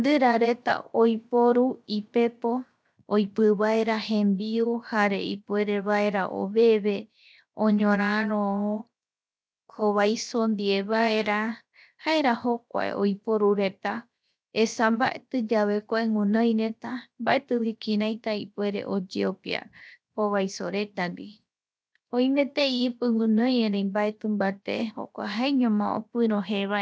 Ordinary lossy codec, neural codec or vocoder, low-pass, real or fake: none; codec, 16 kHz, 0.7 kbps, FocalCodec; none; fake